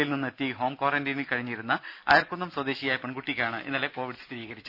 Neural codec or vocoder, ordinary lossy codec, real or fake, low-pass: none; none; real; 5.4 kHz